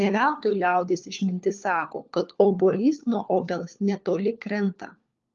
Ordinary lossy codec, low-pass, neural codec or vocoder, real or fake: Opus, 32 kbps; 7.2 kHz; codec, 16 kHz, 4 kbps, FunCodec, trained on LibriTTS, 50 frames a second; fake